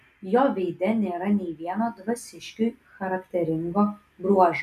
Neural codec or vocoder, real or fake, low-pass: none; real; 14.4 kHz